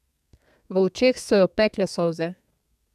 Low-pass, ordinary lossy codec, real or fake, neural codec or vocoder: 14.4 kHz; none; fake; codec, 32 kHz, 1.9 kbps, SNAC